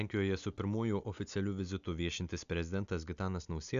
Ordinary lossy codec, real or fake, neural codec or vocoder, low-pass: AAC, 96 kbps; real; none; 7.2 kHz